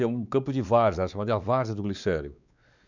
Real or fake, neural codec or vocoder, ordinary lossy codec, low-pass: fake; codec, 16 kHz, 4 kbps, X-Codec, WavLM features, trained on Multilingual LibriSpeech; none; 7.2 kHz